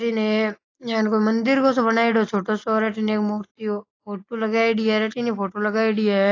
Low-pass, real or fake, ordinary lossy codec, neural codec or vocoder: 7.2 kHz; real; AAC, 32 kbps; none